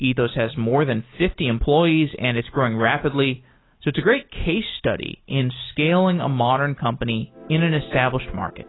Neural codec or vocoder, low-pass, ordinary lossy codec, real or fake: none; 7.2 kHz; AAC, 16 kbps; real